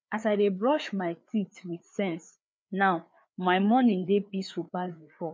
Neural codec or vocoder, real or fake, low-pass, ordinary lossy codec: codec, 16 kHz, 4 kbps, FreqCodec, larger model; fake; none; none